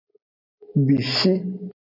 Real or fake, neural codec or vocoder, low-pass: real; none; 5.4 kHz